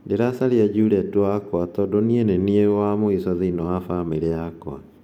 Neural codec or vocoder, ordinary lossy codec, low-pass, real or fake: vocoder, 44.1 kHz, 128 mel bands every 512 samples, BigVGAN v2; MP3, 96 kbps; 19.8 kHz; fake